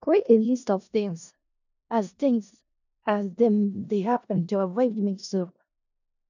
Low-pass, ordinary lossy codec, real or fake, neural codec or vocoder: 7.2 kHz; none; fake; codec, 16 kHz in and 24 kHz out, 0.4 kbps, LongCat-Audio-Codec, four codebook decoder